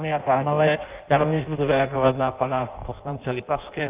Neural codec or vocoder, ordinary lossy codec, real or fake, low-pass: codec, 16 kHz in and 24 kHz out, 0.6 kbps, FireRedTTS-2 codec; Opus, 24 kbps; fake; 3.6 kHz